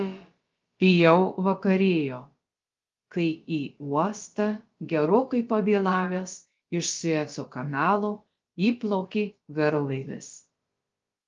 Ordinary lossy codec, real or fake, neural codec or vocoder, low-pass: Opus, 32 kbps; fake; codec, 16 kHz, about 1 kbps, DyCAST, with the encoder's durations; 7.2 kHz